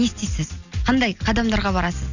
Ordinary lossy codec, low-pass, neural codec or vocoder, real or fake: none; 7.2 kHz; none; real